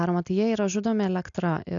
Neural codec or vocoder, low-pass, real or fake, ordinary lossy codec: none; 7.2 kHz; real; AAC, 64 kbps